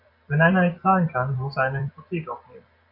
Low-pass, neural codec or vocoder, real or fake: 5.4 kHz; vocoder, 44.1 kHz, 128 mel bands every 256 samples, BigVGAN v2; fake